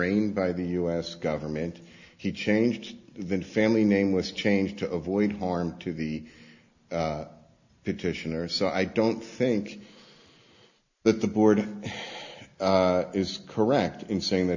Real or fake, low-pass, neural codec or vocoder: real; 7.2 kHz; none